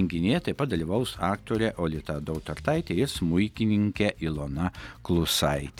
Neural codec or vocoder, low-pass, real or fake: none; 19.8 kHz; real